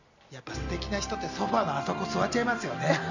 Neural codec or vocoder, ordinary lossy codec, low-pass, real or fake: none; none; 7.2 kHz; real